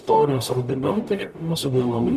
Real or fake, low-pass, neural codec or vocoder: fake; 14.4 kHz; codec, 44.1 kHz, 0.9 kbps, DAC